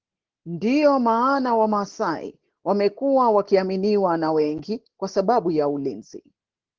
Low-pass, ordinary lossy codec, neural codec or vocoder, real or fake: 7.2 kHz; Opus, 16 kbps; none; real